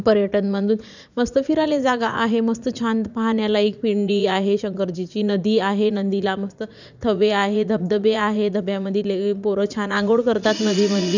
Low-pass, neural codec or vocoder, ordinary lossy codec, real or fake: 7.2 kHz; vocoder, 44.1 kHz, 80 mel bands, Vocos; none; fake